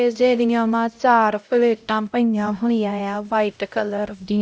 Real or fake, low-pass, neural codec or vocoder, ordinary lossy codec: fake; none; codec, 16 kHz, 0.5 kbps, X-Codec, HuBERT features, trained on LibriSpeech; none